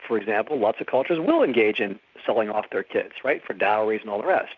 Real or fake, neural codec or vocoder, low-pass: real; none; 7.2 kHz